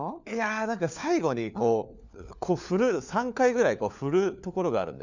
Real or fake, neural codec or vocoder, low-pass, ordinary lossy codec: fake; codec, 16 kHz, 4 kbps, FunCodec, trained on LibriTTS, 50 frames a second; 7.2 kHz; none